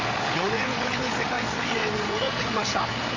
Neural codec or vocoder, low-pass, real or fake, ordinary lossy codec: codec, 16 kHz, 8 kbps, FreqCodec, larger model; 7.2 kHz; fake; MP3, 48 kbps